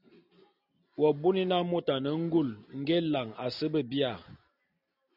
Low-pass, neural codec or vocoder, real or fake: 5.4 kHz; none; real